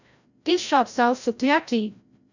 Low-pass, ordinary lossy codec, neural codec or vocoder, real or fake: 7.2 kHz; none; codec, 16 kHz, 0.5 kbps, FreqCodec, larger model; fake